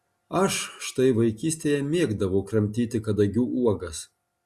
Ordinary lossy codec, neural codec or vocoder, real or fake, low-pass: Opus, 64 kbps; none; real; 14.4 kHz